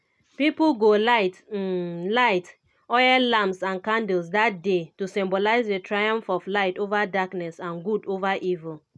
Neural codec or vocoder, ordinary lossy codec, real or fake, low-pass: none; none; real; none